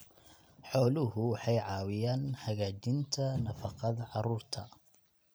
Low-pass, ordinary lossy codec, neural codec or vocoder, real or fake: none; none; none; real